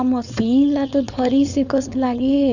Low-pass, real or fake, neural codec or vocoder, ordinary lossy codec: 7.2 kHz; fake; codec, 16 kHz, 4.8 kbps, FACodec; none